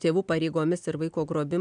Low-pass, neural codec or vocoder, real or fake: 9.9 kHz; none; real